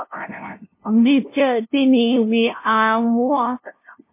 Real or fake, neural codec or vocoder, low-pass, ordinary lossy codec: fake; codec, 16 kHz, 0.5 kbps, FunCodec, trained on LibriTTS, 25 frames a second; 3.6 kHz; MP3, 24 kbps